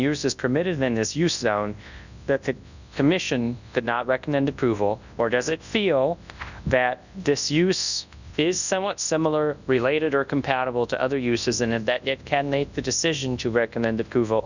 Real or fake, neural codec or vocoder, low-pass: fake; codec, 24 kHz, 0.9 kbps, WavTokenizer, large speech release; 7.2 kHz